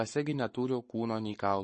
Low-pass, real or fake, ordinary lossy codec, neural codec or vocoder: 10.8 kHz; fake; MP3, 32 kbps; codec, 44.1 kHz, 7.8 kbps, Pupu-Codec